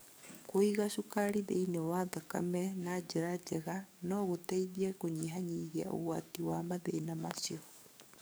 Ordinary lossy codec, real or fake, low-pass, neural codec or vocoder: none; fake; none; codec, 44.1 kHz, 7.8 kbps, DAC